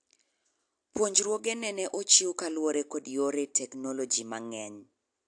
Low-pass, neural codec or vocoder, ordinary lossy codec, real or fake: 9.9 kHz; none; AAC, 64 kbps; real